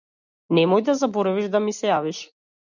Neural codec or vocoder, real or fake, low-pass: none; real; 7.2 kHz